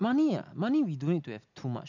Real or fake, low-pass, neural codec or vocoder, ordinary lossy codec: real; 7.2 kHz; none; none